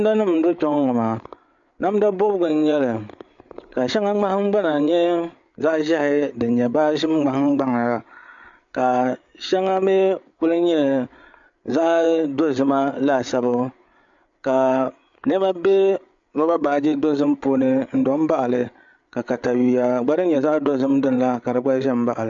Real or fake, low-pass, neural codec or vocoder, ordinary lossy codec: fake; 7.2 kHz; codec, 16 kHz, 8 kbps, FreqCodec, larger model; MP3, 64 kbps